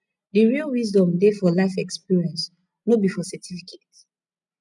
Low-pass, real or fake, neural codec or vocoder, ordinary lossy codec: 10.8 kHz; real; none; none